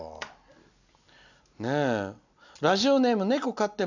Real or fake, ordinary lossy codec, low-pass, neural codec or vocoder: real; none; 7.2 kHz; none